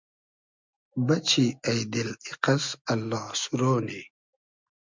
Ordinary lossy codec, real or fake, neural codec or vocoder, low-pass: MP3, 64 kbps; real; none; 7.2 kHz